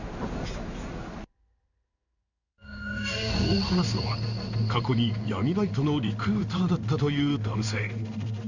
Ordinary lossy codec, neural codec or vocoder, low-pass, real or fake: none; codec, 16 kHz in and 24 kHz out, 1 kbps, XY-Tokenizer; 7.2 kHz; fake